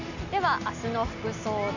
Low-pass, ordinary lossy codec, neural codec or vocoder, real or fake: 7.2 kHz; none; none; real